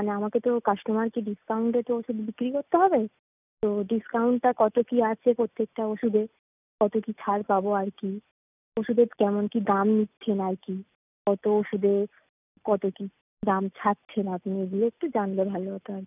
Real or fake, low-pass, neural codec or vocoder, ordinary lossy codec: real; 3.6 kHz; none; none